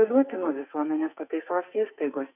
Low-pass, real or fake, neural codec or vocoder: 3.6 kHz; fake; codec, 44.1 kHz, 2.6 kbps, SNAC